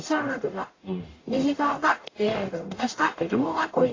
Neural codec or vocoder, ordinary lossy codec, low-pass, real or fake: codec, 44.1 kHz, 0.9 kbps, DAC; AAC, 32 kbps; 7.2 kHz; fake